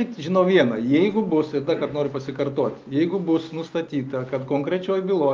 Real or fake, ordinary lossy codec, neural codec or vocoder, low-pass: real; Opus, 24 kbps; none; 7.2 kHz